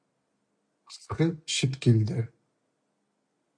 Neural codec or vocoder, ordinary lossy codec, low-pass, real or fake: none; MP3, 64 kbps; 9.9 kHz; real